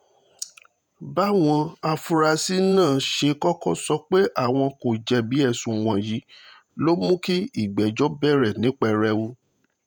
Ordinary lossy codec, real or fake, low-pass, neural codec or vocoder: none; fake; none; vocoder, 48 kHz, 128 mel bands, Vocos